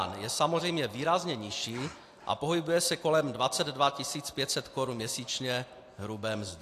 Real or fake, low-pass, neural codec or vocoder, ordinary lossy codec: real; 14.4 kHz; none; AAC, 64 kbps